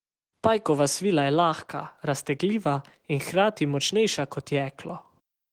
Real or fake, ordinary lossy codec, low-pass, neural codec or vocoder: fake; Opus, 16 kbps; 19.8 kHz; autoencoder, 48 kHz, 128 numbers a frame, DAC-VAE, trained on Japanese speech